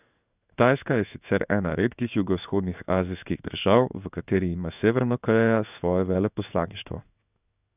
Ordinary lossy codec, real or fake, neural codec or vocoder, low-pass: none; fake; codec, 16 kHz in and 24 kHz out, 1 kbps, XY-Tokenizer; 3.6 kHz